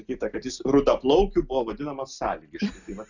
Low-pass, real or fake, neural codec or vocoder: 7.2 kHz; real; none